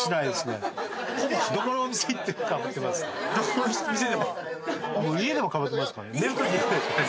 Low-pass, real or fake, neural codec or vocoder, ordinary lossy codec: none; real; none; none